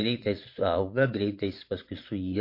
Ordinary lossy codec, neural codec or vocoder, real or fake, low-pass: MP3, 32 kbps; vocoder, 22.05 kHz, 80 mel bands, Vocos; fake; 5.4 kHz